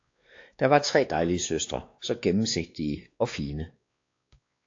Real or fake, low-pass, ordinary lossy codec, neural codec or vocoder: fake; 7.2 kHz; AAC, 48 kbps; codec, 16 kHz, 2 kbps, X-Codec, WavLM features, trained on Multilingual LibriSpeech